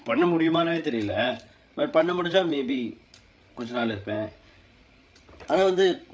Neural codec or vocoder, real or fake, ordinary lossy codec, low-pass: codec, 16 kHz, 8 kbps, FreqCodec, larger model; fake; none; none